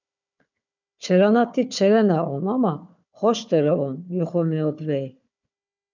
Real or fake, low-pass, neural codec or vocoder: fake; 7.2 kHz; codec, 16 kHz, 4 kbps, FunCodec, trained on Chinese and English, 50 frames a second